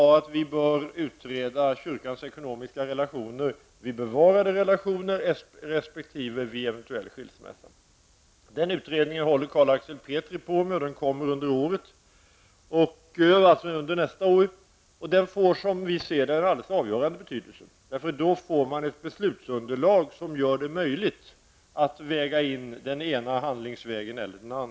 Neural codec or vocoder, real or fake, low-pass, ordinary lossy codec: none; real; none; none